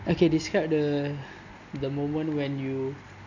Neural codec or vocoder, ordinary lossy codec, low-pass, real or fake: none; none; 7.2 kHz; real